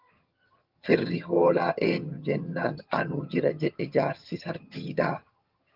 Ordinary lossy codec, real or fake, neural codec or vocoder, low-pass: Opus, 32 kbps; fake; vocoder, 22.05 kHz, 80 mel bands, HiFi-GAN; 5.4 kHz